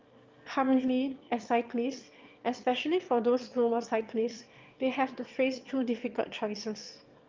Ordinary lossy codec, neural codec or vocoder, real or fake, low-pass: Opus, 32 kbps; autoencoder, 22.05 kHz, a latent of 192 numbers a frame, VITS, trained on one speaker; fake; 7.2 kHz